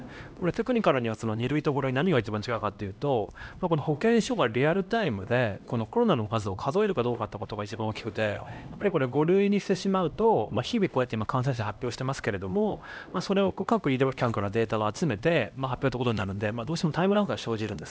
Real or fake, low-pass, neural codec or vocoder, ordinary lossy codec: fake; none; codec, 16 kHz, 1 kbps, X-Codec, HuBERT features, trained on LibriSpeech; none